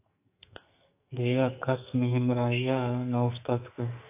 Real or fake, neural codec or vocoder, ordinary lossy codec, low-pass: fake; codec, 44.1 kHz, 2.6 kbps, SNAC; AAC, 32 kbps; 3.6 kHz